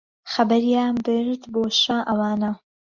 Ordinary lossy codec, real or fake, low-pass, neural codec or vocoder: Opus, 64 kbps; real; 7.2 kHz; none